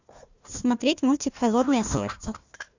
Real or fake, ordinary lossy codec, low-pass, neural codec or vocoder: fake; Opus, 64 kbps; 7.2 kHz; codec, 16 kHz, 1 kbps, FunCodec, trained on Chinese and English, 50 frames a second